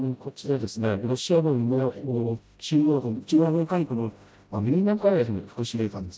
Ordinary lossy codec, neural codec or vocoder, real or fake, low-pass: none; codec, 16 kHz, 0.5 kbps, FreqCodec, smaller model; fake; none